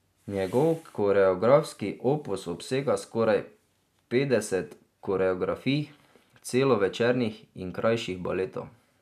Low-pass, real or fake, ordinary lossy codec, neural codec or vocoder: 14.4 kHz; real; none; none